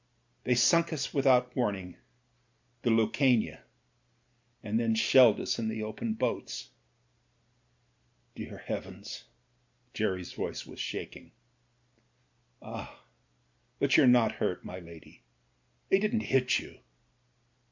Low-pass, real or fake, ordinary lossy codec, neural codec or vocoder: 7.2 kHz; real; MP3, 64 kbps; none